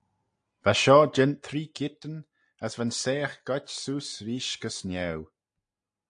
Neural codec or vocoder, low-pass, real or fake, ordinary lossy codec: none; 9.9 kHz; real; AAC, 64 kbps